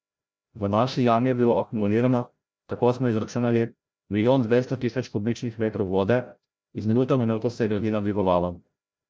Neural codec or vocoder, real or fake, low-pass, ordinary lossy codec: codec, 16 kHz, 0.5 kbps, FreqCodec, larger model; fake; none; none